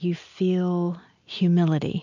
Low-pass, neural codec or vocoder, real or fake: 7.2 kHz; none; real